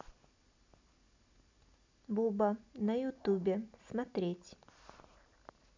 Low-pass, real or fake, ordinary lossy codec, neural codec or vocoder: 7.2 kHz; real; MP3, 64 kbps; none